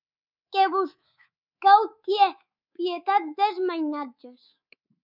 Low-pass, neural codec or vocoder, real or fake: 5.4 kHz; codec, 24 kHz, 3.1 kbps, DualCodec; fake